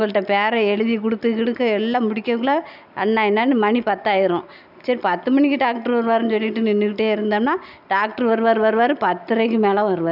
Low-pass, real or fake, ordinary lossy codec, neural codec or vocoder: 5.4 kHz; real; none; none